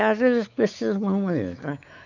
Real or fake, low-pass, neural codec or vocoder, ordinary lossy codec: fake; 7.2 kHz; codec, 16 kHz, 4 kbps, X-Codec, WavLM features, trained on Multilingual LibriSpeech; none